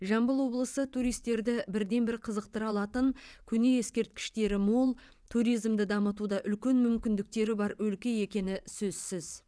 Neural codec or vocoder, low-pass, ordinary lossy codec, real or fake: vocoder, 22.05 kHz, 80 mel bands, WaveNeXt; none; none; fake